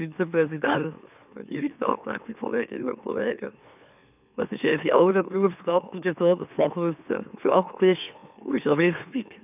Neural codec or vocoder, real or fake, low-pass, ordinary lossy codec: autoencoder, 44.1 kHz, a latent of 192 numbers a frame, MeloTTS; fake; 3.6 kHz; none